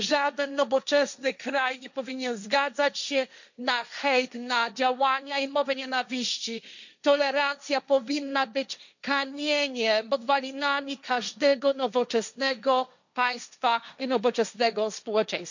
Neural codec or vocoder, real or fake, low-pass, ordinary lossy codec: codec, 16 kHz, 1.1 kbps, Voila-Tokenizer; fake; 7.2 kHz; none